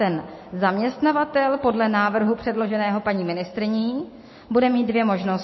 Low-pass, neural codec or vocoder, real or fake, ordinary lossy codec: 7.2 kHz; none; real; MP3, 24 kbps